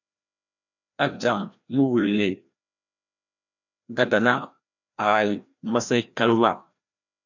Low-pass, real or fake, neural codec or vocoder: 7.2 kHz; fake; codec, 16 kHz, 1 kbps, FreqCodec, larger model